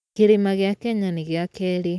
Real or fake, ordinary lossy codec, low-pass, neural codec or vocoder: real; none; none; none